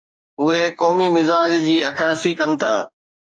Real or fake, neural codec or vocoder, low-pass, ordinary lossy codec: fake; codec, 44.1 kHz, 2.6 kbps, DAC; 9.9 kHz; AAC, 64 kbps